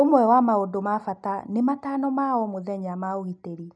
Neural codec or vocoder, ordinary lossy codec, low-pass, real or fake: none; none; none; real